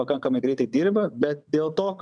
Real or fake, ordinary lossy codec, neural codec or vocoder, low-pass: fake; MP3, 96 kbps; vocoder, 22.05 kHz, 80 mel bands, WaveNeXt; 9.9 kHz